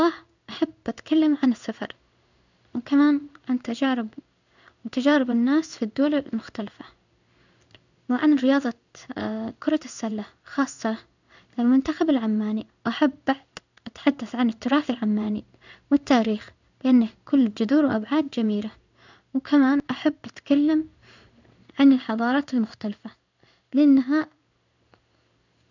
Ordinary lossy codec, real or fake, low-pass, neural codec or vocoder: none; fake; 7.2 kHz; codec, 16 kHz in and 24 kHz out, 1 kbps, XY-Tokenizer